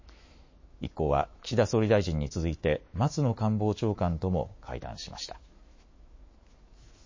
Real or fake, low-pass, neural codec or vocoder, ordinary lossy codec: fake; 7.2 kHz; autoencoder, 48 kHz, 128 numbers a frame, DAC-VAE, trained on Japanese speech; MP3, 32 kbps